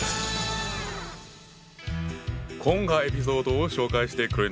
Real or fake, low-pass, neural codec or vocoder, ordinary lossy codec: real; none; none; none